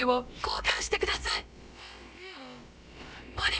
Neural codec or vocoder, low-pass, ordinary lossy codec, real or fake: codec, 16 kHz, about 1 kbps, DyCAST, with the encoder's durations; none; none; fake